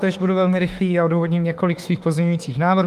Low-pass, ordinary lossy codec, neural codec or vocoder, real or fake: 14.4 kHz; Opus, 32 kbps; autoencoder, 48 kHz, 32 numbers a frame, DAC-VAE, trained on Japanese speech; fake